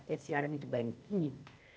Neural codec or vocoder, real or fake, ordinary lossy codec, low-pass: codec, 16 kHz, 0.8 kbps, ZipCodec; fake; none; none